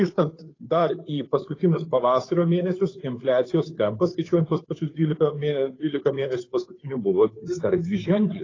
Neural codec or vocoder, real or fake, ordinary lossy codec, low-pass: codec, 16 kHz, 2 kbps, FunCodec, trained on Chinese and English, 25 frames a second; fake; AAC, 32 kbps; 7.2 kHz